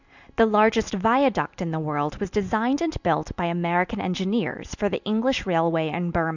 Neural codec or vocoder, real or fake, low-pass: vocoder, 44.1 kHz, 128 mel bands every 256 samples, BigVGAN v2; fake; 7.2 kHz